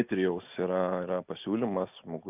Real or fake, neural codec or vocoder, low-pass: real; none; 3.6 kHz